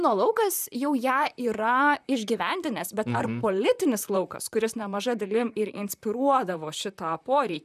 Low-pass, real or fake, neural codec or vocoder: 14.4 kHz; fake; vocoder, 44.1 kHz, 128 mel bands, Pupu-Vocoder